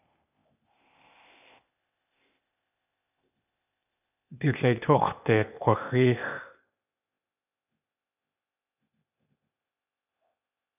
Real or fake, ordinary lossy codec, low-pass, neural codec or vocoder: fake; AAC, 32 kbps; 3.6 kHz; codec, 16 kHz, 0.8 kbps, ZipCodec